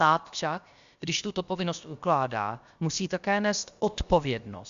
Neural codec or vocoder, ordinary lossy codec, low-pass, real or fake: codec, 16 kHz, about 1 kbps, DyCAST, with the encoder's durations; Opus, 64 kbps; 7.2 kHz; fake